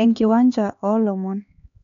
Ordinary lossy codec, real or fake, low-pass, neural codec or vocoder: none; fake; 7.2 kHz; codec, 16 kHz, 4 kbps, X-Codec, HuBERT features, trained on LibriSpeech